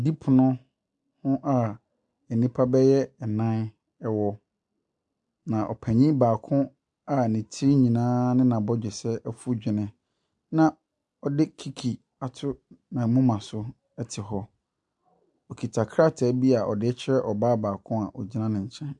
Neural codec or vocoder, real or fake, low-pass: none; real; 10.8 kHz